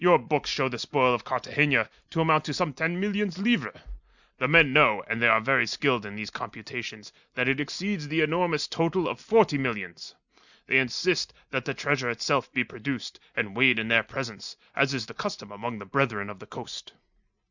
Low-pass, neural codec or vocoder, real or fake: 7.2 kHz; none; real